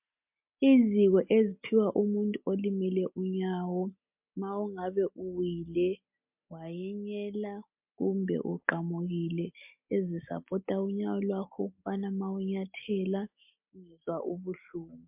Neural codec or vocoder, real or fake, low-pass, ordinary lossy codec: none; real; 3.6 kHz; MP3, 32 kbps